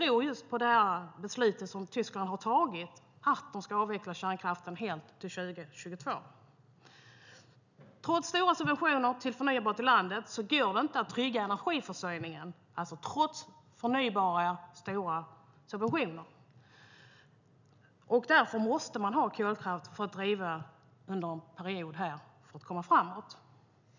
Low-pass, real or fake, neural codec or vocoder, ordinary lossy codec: 7.2 kHz; real; none; none